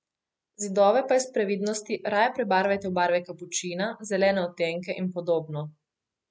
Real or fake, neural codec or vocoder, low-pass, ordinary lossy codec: real; none; none; none